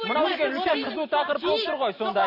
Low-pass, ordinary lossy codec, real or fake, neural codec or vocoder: 5.4 kHz; MP3, 24 kbps; real; none